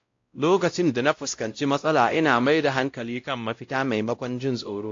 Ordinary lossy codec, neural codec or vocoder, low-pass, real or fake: AAC, 48 kbps; codec, 16 kHz, 0.5 kbps, X-Codec, WavLM features, trained on Multilingual LibriSpeech; 7.2 kHz; fake